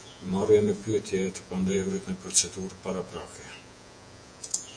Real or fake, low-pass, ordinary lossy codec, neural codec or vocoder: fake; 9.9 kHz; AAC, 64 kbps; vocoder, 48 kHz, 128 mel bands, Vocos